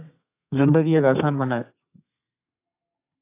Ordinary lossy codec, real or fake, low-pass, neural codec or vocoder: AAC, 24 kbps; fake; 3.6 kHz; codec, 32 kHz, 1.9 kbps, SNAC